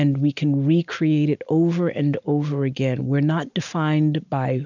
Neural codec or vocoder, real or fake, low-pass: none; real; 7.2 kHz